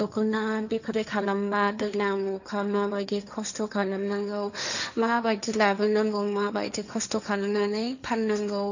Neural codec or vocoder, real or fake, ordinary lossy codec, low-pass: codec, 16 kHz, 1.1 kbps, Voila-Tokenizer; fake; none; 7.2 kHz